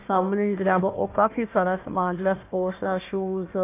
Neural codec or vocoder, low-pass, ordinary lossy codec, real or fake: codec, 16 kHz, 1 kbps, FunCodec, trained on Chinese and English, 50 frames a second; 3.6 kHz; AAC, 24 kbps; fake